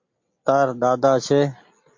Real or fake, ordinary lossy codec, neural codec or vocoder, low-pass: real; MP3, 48 kbps; none; 7.2 kHz